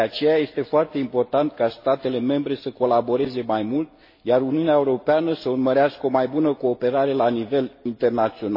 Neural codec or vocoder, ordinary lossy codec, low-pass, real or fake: none; MP3, 24 kbps; 5.4 kHz; real